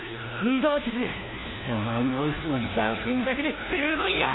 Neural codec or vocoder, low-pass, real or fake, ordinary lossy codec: codec, 16 kHz, 1 kbps, FunCodec, trained on LibriTTS, 50 frames a second; 7.2 kHz; fake; AAC, 16 kbps